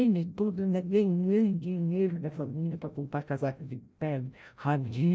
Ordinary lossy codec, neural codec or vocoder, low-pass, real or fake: none; codec, 16 kHz, 0.5 kbps, FreqCodec, larger model; none; fake